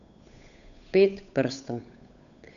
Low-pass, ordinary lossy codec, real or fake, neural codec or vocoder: 7.2 kHz; none; fake; codec, 16 kHz, 8 kbps, FunCodec, trained on Chinese and English, 25 frames a second